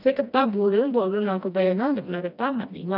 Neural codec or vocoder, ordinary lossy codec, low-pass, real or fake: codec, 16 kHz, 1 kbps, FreqCodec, smaller model; none; 5.4 kHz; fake